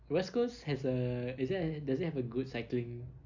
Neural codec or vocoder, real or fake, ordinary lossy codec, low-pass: none; real; none; 7.2 kHz